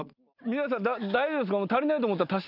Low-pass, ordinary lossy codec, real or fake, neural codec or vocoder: 5.4 kHz; none; real; none